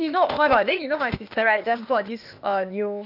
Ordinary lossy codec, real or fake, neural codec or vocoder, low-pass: none; fake; codec, 16 kHz, 0.8 kbps, ZipCodec; 5.4 kHz